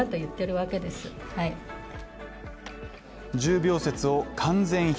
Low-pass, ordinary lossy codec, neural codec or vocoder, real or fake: none; none; none; real